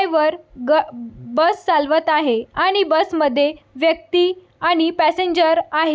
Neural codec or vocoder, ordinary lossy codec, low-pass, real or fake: none; none; none; real